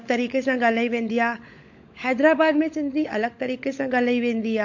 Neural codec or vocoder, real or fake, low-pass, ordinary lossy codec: codec, 16 kHz, 16 kbps, FunCodec, trained on LibriTTS, 50 frames a second; fake; 7.2 kHz; MP3, 48 kbps